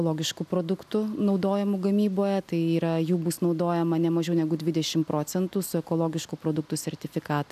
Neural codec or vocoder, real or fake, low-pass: none; real; 14.4 kHz